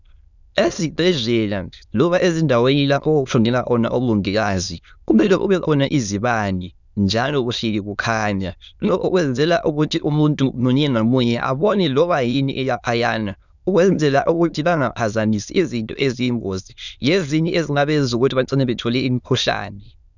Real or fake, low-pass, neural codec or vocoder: fake; 7.2 kHz; autoencoder, 22.05 kHz, a latent of 192 numbers a frame, VITS, trained on many speakers